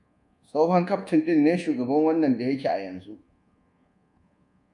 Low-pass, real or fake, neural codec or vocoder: 10.8 kHz; fake; codec, 24 kHz, 1.2 kbps, DualCodec